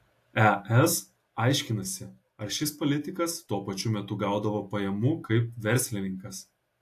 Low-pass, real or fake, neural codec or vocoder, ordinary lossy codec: 14.4 kHz; real; none; AAC, 64 kbps